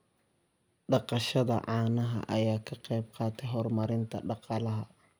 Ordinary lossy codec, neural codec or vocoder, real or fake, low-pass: none; none; real; none